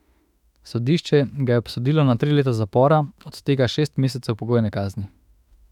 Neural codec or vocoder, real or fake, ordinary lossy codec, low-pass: autoencoder, 48 kHz, 32 numbers a frame, DAC-VAE, trained on Japanese speech; fake; none; 19.8 kHz